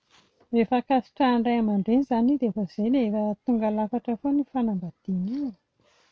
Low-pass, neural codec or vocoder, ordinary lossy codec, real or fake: none; none; none; real